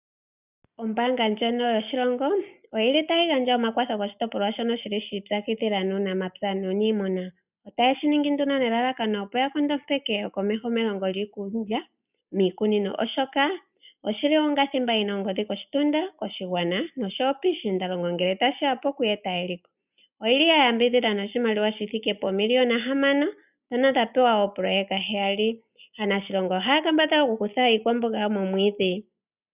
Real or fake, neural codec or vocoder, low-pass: real; none; 3.6 kHz